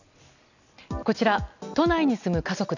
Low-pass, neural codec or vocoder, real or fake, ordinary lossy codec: 7.2 kHz; none; real; none